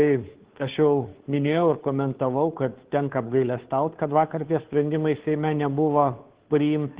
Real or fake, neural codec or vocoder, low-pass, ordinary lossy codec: fake; codec, 16 kHz, 2 kbps, FunCodec, trained on Chinese and English, 25 frames a second; 3.6 kHz; Opus, 16 kbps